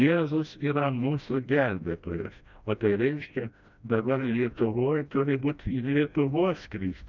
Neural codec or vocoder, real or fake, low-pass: codec, 16 kHz, 1 kbps, FreqCodec, smaller model; fake; 7.2 kHz